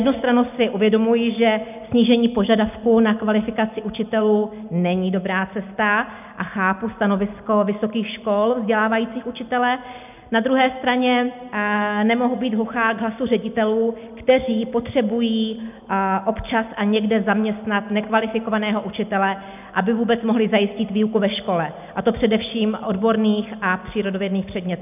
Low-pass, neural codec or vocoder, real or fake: 3.6 kHz; none; real